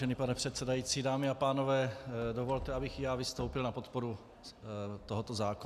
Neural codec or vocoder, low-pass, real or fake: none; 14.4 kHz; real